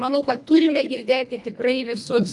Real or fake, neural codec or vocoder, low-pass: fake; codec, 24 kHz, 1.5 kbps, HILCodec; 10.8 kHz